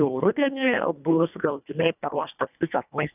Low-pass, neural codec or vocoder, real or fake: 3.6 kHz; codec, 24 kHz, 1.5 kbps, HILCodec; fake